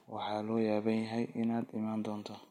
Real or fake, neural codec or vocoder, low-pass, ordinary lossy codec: real; none; 19.8 kHz; MP3, 64 kbps